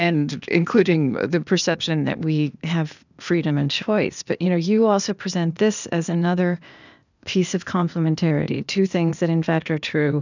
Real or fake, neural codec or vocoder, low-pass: fake; codec, 16 kHz, 0.8 kbps, ZipCodec; 7.2 kHz